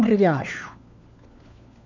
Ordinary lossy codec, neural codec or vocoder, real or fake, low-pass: none; codec, 16 kHz, 4 kbps, FunCodec, trained on LibriTTS, 50 frames a second; fake; 7.2 kHz